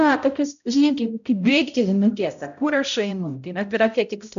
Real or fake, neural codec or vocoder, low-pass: fake; codec, 16 kHz, 0.5 kbps, X-Codec, HuBERT features, trained on balanced general audio; 7.2 kHz